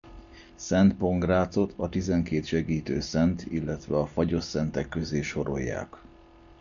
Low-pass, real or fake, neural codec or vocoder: 7.2 kHz; real; none